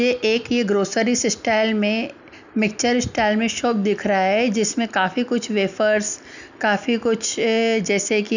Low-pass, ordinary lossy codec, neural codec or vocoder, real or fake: 7.2 kHz; none; none; real